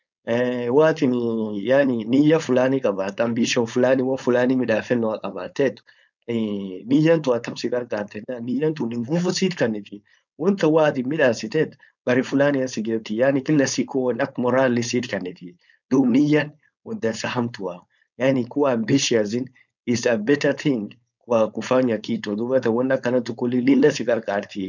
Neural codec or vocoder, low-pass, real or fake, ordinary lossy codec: codec, 16 kHz, 4.8 kbps, FACodec; 7.2 kHz; fake; none